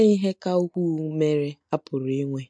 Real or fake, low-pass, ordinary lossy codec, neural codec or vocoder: real; 9.9 kHz; MP3, 48 kbps; none